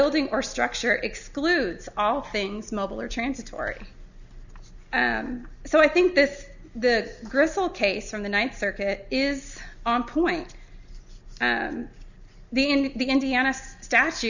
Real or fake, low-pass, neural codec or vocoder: real; 7.2 kHz; none